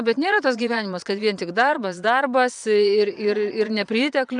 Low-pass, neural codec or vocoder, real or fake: 9.9 kHz; vocoder, 22.05 kHz, 80 mel bands, Vocos; fake